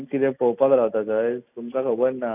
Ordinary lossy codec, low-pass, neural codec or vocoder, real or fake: none; 3.6 kHz; none; real